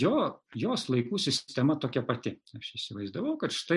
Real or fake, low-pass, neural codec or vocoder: real; 10.8 kHz; none